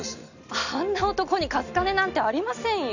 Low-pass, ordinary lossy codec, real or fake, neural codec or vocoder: 7.2 kHz; none; real; none